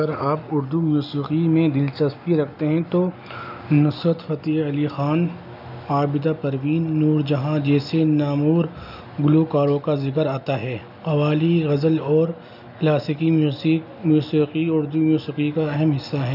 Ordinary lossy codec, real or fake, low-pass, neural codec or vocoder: none; real; 5.4 kHz; none